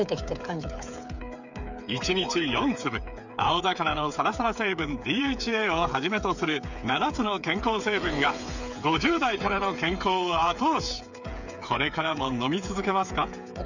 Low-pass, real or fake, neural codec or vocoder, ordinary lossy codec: 7.2 kHz; fake; codec, 16 kHz, 8 kbps, FreqCodec, smaller model; none